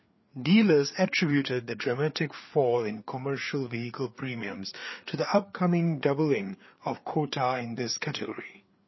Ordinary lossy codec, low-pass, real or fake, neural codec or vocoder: MP3, 24 kbps; 7.2 kHz; fake; codec, 16 kHz, 4 kbps, FreqCodec, larger model